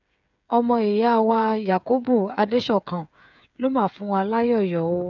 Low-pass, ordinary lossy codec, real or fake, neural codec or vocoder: 7.2 kHz; none; fake; codec, 16 kHz, 8 kbps, FreqCodec, smaller model